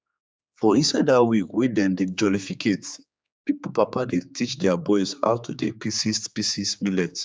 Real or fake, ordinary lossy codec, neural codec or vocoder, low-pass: fake; none; codec, 16 kHz, 4 kbps, X-Codec, HuBERT features, trained on general audio; none